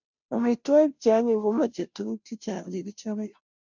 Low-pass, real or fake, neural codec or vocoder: 7.2 kHz; fake; codec, 16 kHz, 0.5 kbps, FunCodec, trained on Chinese and English, 25 frames a second